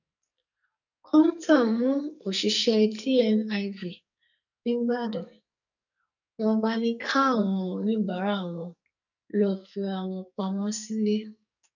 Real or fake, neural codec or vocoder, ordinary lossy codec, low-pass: fake; codec, 44.1 kHz, 2.6 kbps, SNAC; none; 7.2 kHz